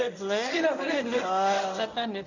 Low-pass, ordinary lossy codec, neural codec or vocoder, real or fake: 7.2 kHz; none; codec, 24 kHz, 0.9 kbps, WavTokenizer, medium speech release version 1; fake